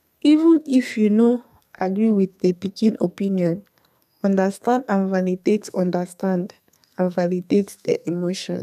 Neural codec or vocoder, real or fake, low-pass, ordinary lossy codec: codec, 32 kHz, 1.9 kbps, SNAC; fake; 14.4 kHz; none